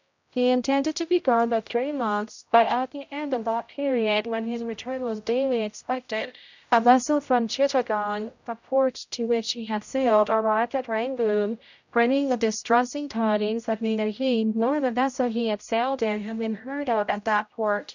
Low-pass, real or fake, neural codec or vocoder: 7.2 kHz; fake; codec, 16 kHz, 0.5 kbps, X-Codec, HuBERT features, trained on general audio